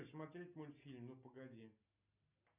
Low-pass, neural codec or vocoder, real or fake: 3.6 kHz; none; real